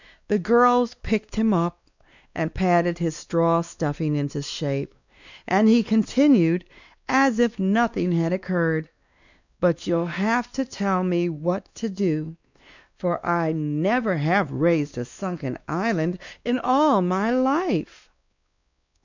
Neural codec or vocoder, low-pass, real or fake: codec, 16 kHz, 2 kbps, X-Codec, WavLM features, trained on Multilingual LibriSpeech; 7.2 kHz; fake